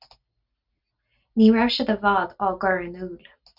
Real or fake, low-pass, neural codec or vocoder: real; 5.4 kHz; none